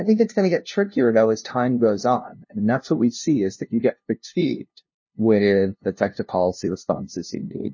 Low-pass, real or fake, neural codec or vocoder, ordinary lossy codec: 7.2 kHz; fake; codec, 16 kHz, 0.5 kbps, FunCodec, trained on LibriTTS, 25 frames a second; MP3, 32 kbps